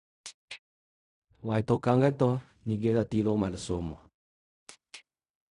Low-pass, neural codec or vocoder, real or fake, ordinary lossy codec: 10.8 kHz; codec, 16 kHz in and 24 kHz out, 0.4 kbps, LongCat-Audio-Codec, fine tuned four codebook decoder; fake; none